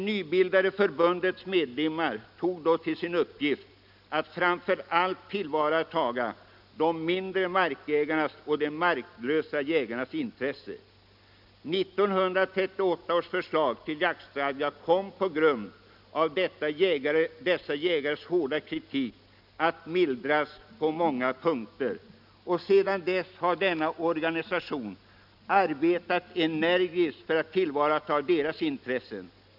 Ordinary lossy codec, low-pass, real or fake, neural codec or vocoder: none; 5.4 kHz; real; none